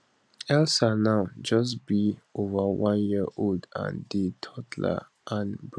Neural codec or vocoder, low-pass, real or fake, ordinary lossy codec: none; none; real; none